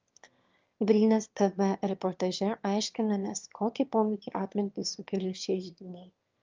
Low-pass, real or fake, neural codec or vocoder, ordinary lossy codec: 7.2 kHz; fake; autoencoder, 22.05 kHz, a latent of 192 numbers a frame, VITS, trained on one speaker; Opus, 24 kbps